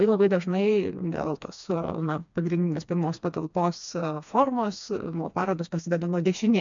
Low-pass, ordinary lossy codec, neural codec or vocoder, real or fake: 7.2 kHz; MP3, 64 kbps; codec, 16 kHz, 2 kbps, FreqCodec, smaller model; fake